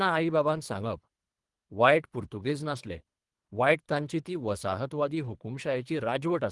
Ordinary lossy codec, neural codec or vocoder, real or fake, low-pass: Opus, 24 kbps; codec, 24 kHz, 3 kbps, HILCodec; fake; 10.8 kHz